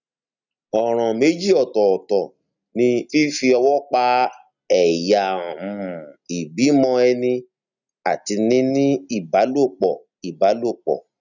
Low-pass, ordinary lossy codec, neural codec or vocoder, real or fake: 7.2 kHz; none; none; real